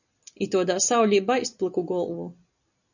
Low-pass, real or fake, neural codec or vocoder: 7.2 kHz; real; none